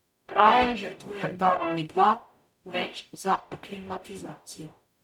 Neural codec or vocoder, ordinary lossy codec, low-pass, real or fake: codec, 44.1 kHz, 0.9 kbps, DAC; none; 19.8 kHz; fake